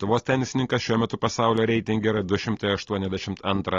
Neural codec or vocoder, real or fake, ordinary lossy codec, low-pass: none; real; AAC, 32 kbps; 7.2 kHz